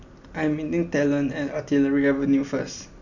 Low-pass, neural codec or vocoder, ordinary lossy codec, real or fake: 7.2 kHz; none; none; real